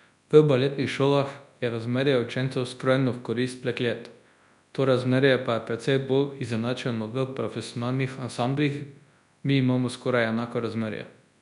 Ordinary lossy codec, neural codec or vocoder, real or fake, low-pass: none; codec, 24 kHz, 0.9 kbps, WavTokenizer, large speech release; fake; 10.8 kHz